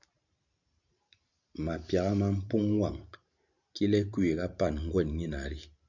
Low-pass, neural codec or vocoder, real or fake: 7.2 kHz; vocoder, 44.1 kHz, 128 mel bands every 512 samples, BigVGAN v2; fake